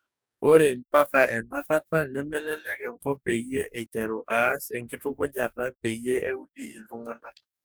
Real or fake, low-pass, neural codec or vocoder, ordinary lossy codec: fake; none; codec, 44.1 kHz, 2.6 kbps, DAC; none